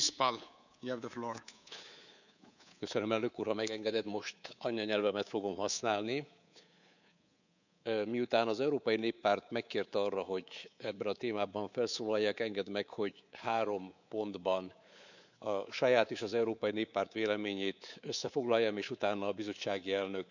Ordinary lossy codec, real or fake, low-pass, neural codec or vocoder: none; fake; 7.2 kHz; codec, 24 kHz, 3.1 kbps, DualCodec